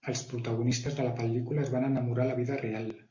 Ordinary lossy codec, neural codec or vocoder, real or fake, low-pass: MP3, 48 kbps; none; real; 7.2 kHz